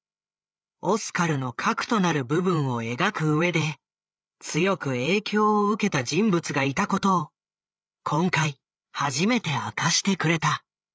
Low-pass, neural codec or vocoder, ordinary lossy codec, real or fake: none; codec, 16 kHz, 8 kbps, FreqCodec, larger model; none; fake